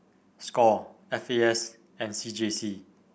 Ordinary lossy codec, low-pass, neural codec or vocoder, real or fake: none; none; none; real